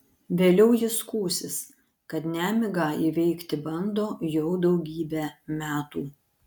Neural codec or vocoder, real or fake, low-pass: none; real; 19.8 kHz